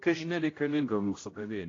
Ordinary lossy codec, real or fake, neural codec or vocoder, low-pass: AAC, 32 kbps; fake; codec, 16 kHz, 0.5 kbps, X-Codec, HuBERT features, trained on general audio; 7.2 kHz